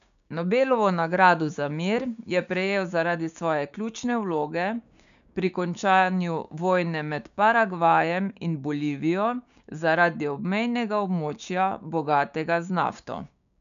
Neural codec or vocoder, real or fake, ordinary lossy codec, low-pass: codec, 16 kHz, 6 kbps, DAC; fake; none; 7.2 kHz